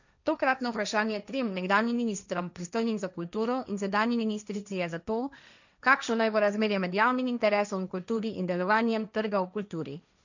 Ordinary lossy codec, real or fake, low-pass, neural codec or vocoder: none; fake; 7.2 kHz; codec, 16 kHz, 1.1 kbps, Voila-Tokenizer